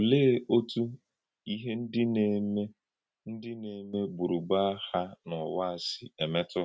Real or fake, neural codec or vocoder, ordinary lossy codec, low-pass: real; none; none; none